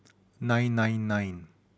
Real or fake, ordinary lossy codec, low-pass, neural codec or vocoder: real; none; none; none